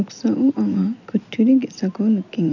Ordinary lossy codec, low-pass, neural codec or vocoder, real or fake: none; 7.2 kHz; none; real